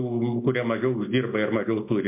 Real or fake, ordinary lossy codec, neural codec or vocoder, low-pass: real; MP3, 32 kbps; none; 3.6 kHz